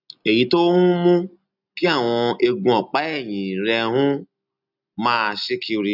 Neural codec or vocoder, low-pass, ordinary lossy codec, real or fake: none; 5.4 kHz; none; real